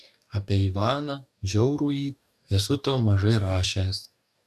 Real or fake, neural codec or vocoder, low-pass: fake; codec, 44.1 kHz, 2.6 kbps, DAC; 14.4 kHz